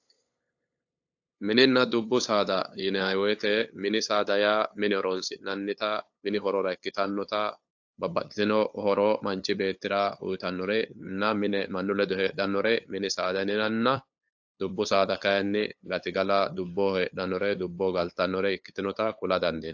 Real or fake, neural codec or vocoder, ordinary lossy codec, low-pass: fake; codec, 16 kHz, 8 kbps, FunCodec, trained on LibriTTS, 25 frames a second; AAC, 48 kbps; 7.2 kHz